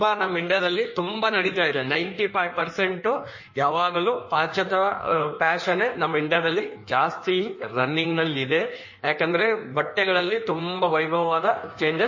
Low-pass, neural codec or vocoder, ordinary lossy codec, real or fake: 7.2 kHz; codec, 16 kHz in and 24 kHz out, 1.1 kbps, FireRedTTS-2 codec; MP3, 32 kbps; fake